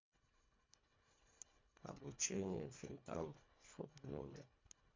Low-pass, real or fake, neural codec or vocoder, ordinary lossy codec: 7.2 kHz; fake; codec, 24 kHz, 1.5 kbps, HILCodec; MP3, 48 kbps